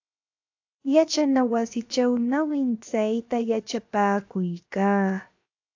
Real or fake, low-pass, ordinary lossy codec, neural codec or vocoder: fake; 7.2 kHz; AAC, 48 kbps; codec, 16 kHz, 0.7 kbps, FocalCodec